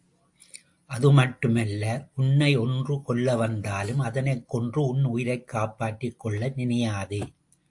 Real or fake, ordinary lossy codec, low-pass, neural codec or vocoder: real; AAC, 64 kbps; 10.8 kHz; none